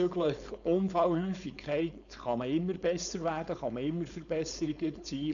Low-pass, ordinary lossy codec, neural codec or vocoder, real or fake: 7.2 kHz; none; codec, 16 kHz, 4.8 kbps, FACodec; fake